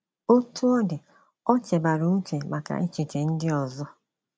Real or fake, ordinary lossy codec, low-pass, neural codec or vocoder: real; none; none; none